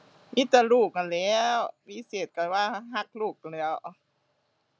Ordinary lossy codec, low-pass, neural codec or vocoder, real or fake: none; none; none; real